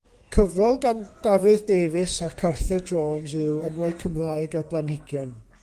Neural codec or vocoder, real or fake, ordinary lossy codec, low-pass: codec, 32 kHz, 1.9 kbps, SNAC; fake; Opus, 32 kbps; 14.4 kHz